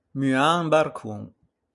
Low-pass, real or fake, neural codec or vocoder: 10.8 kHz; real; none